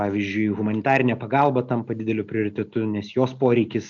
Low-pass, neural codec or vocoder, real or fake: 7.2 kHz; none; real